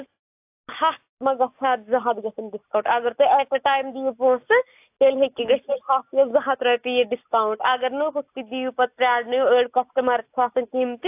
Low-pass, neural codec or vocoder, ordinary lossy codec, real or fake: 3.6 kHz; none; AAC, 32 kbps; real